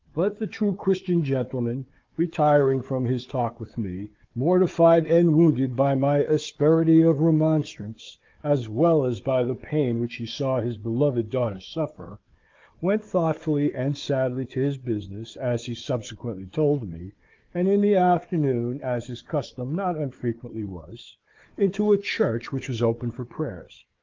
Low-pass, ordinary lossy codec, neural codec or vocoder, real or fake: 7.2 kHz; Opus, 32 kbps; codec, 16 kHz, 4 kbps, FunCodec, trained on Chinese and English, 50 frames a second; fake